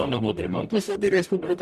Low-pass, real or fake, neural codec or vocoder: 14.4 kHz; fake; codec, 44.1 kHz, 0.9 kbps, DAC